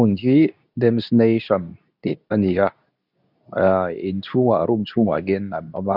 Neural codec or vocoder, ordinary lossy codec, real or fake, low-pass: codec, 24 kHz, 0.9 kbps, WavTokenizer, medium speech release version 2; none; fake; 5.4 kHz